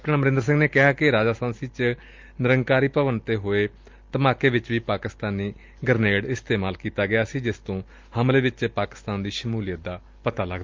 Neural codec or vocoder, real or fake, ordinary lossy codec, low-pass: none; real; Opus, 32 kbps; 7.2 kHz